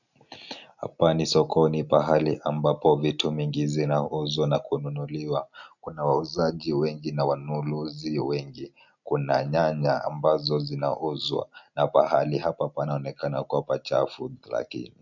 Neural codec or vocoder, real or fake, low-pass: none; real; 7.2 kHz